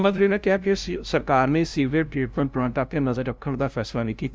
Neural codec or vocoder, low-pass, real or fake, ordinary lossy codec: codec, 16 kHz, 0.5 kbps, FunCodec, trained on LibriTTS, 25 frames a second; none; fake; none